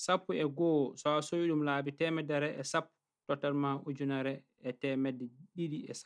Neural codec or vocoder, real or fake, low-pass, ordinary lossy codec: none; real; 9.9 kHz; none